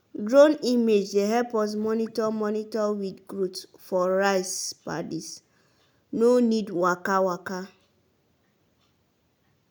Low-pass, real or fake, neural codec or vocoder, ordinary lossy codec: none; real; none; none